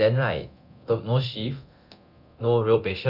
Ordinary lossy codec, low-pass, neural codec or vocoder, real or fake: none; 5.4 kHz; codec, 24 kHz, 0.9 kbps, DualCodec; fake